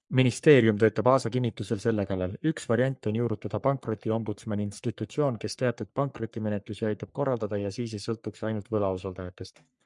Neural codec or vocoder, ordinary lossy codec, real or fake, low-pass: codec, 44.1 kHz, 3.4 kbps, Pupu-Codec; MP3, 96 kbps; fake; 10.8 kHz